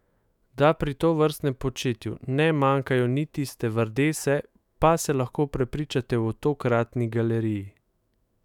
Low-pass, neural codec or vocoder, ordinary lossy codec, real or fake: 19.8 kHz; autoencoder, 48 kHz, 128 numbers a frame, DAC-VAE, trained on Japanese speech; none; fake